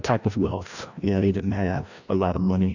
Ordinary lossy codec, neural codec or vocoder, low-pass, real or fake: Opus, 64 kbps; codec, 16 kHz, 1 kbps, FreqCodec, larger model; 7.2 kHz; fake